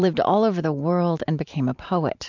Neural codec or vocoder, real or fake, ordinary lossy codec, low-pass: vocoder, 44.1 kHz, 128 mel bands every 512 samples, BigVGAN v2; fake; MP3, 64 kbps; 7.2 kHz